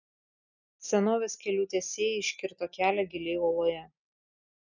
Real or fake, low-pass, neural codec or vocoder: real; 7.2 kHz; none